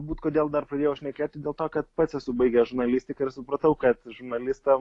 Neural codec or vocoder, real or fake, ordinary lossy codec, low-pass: none; real; AAC, 48 kbps; 10.8 kHz